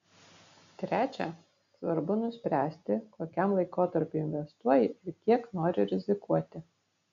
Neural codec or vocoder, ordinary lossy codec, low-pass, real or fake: none; AAC, 64 kbps; 7.2 kHz; real